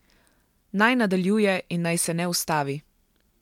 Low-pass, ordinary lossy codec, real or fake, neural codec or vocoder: 19.8 kHz; MP3, 96 kbps; real; none